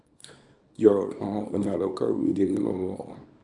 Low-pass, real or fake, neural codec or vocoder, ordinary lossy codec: 10.8 kHz; fake; codec, 24 kHz, 0.9 kbps, WavTokenizer, small release; none